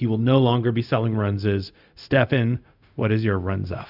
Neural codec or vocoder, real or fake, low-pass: codec, 16 kHz, 0.4 kbps, LongCat-Audio-Codec; fake; 5.4 kHz